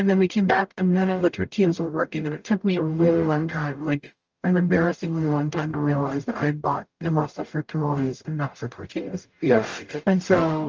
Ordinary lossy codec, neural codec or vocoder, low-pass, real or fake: Opus, 24 kbps; codec, 44.1 kHz, 0.9 kbps, DAC; 7.2 kHz; fake